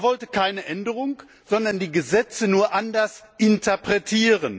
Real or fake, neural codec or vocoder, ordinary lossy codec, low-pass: real; none; none; none